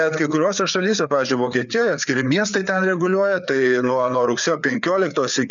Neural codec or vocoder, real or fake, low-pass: codec, 16 kHz, 4 kbps, FreqCodec, larger model; fake; 7.2 kHz